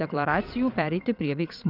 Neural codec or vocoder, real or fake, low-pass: vocoder, 22.05 kHz, 80 mel bands, Vocos; fake; 5.4 kHz